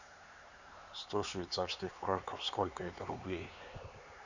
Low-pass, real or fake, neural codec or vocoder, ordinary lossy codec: 7.2 kHz; fake; codec, 16 kHz, 4 kbps, X-Codec, HuBERT features, trained on LibriSpeech; Opus, 64 kbps